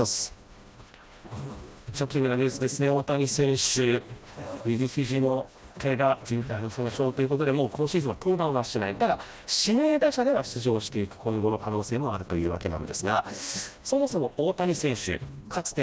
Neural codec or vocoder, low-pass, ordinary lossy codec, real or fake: codec, 16 kHz, 1 kbps, FreqCodec, smaller model; none; none; fake